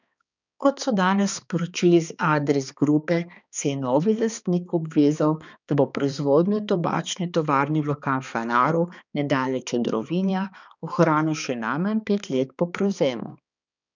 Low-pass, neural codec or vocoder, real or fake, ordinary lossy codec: 7.2 kHz; codec, 16 kHz, 2 kbps, X-Codec, HuBERT features, trained on balanced general audio; fake; none